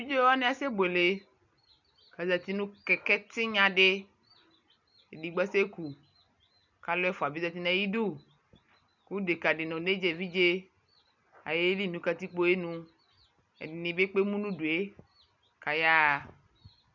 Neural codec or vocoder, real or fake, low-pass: none; real; 7.2 kHz